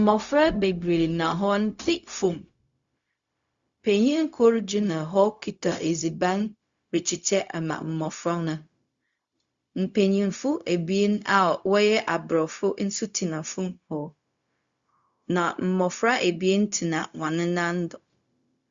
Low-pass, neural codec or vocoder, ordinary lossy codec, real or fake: 7.2 kHz; codec, 16 kHz, 0.4 kbps, LongCat-Audio-Codec; Opus, 64 kbps; fake